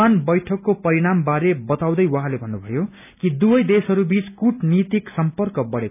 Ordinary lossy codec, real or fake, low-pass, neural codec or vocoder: none; real; 3.6 kHz; none